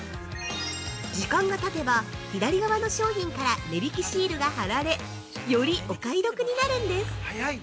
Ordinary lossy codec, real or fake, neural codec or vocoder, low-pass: none; real; none; none